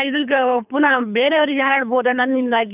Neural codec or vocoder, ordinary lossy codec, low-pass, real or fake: codec, 24 kHz, 3 kbps, HILCodec; none; 3.6 kHz; fake